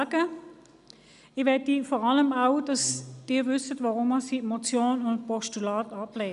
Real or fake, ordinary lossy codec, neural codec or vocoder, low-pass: real; none; none; 10.8 kHz